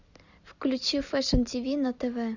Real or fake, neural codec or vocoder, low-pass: real; none; 7.2 kHz